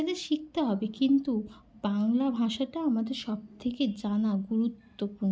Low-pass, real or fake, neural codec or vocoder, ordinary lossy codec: none; real; none; none